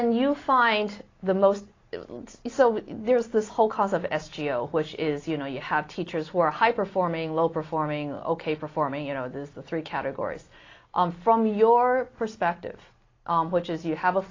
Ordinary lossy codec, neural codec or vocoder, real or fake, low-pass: AAC, 32 kbps; none; real; 7.2 kHz